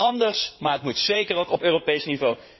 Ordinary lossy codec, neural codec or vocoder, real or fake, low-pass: MP3, 24 kbps; codec, 16 kHz in and 24 kHz out, 2.2 kbps, FireRedTTS-2 codec; fake; 7.2 kHz